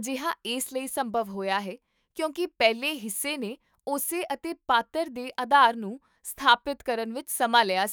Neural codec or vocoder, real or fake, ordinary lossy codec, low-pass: autoencoder, 48 kHz, 128 numbers a frame, DAC-VAE, trained on Japanese speech; fake; none; none